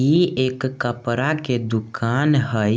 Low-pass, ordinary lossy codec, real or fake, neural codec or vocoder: none; none; real; none